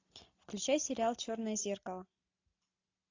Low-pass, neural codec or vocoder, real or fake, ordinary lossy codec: 7.2 kHz; none; real; AAC, 48 kbps